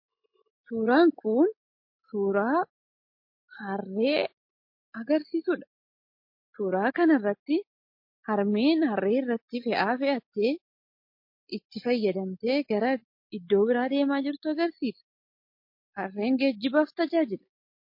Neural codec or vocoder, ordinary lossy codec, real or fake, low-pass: none; MP3, 32 kbps; real; 5.4 kHz